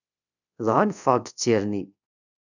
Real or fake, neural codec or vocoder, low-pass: fake; codec, 24 kHz, 0.5 kbps, DualCodec; 7.2 kHz